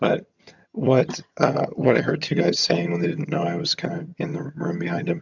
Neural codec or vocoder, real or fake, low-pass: vocoder, 22.05 kHz, 80 mel bands, HiFi-GAN; fake; 7.2 kHz